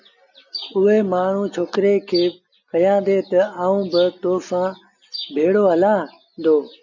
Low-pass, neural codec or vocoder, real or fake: 7.2 kHz; none; real